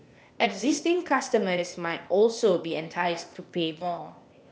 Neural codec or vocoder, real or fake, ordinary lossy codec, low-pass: codec, 16 kHz, 0.8 kbps, ZipCodec; fake; none; none